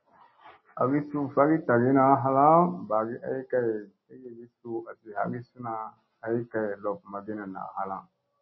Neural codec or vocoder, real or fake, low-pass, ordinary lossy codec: none; real; 7.2 kHz; MP3, 24 kbps